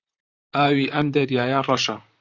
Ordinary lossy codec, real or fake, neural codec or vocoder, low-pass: Opus, 64 kbps; fake; vocoder, 44.1 kHz, 128 mel bands, Pupu-Vocoder; 7.2 kHz